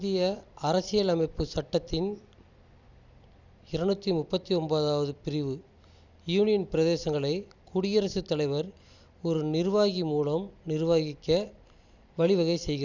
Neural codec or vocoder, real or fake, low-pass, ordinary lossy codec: vocoder, 44.1 kHz, 128 mel bands every 256 samples, BigVGAN v2; fake; 7.2 kHz; none